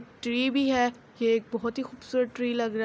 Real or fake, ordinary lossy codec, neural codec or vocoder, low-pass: real; none; none; none